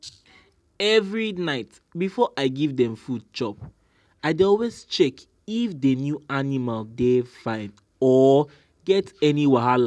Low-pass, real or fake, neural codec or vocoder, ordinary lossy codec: none; real; none; none